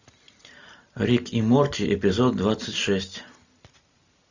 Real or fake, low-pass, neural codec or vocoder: real; 7.2 kHz; none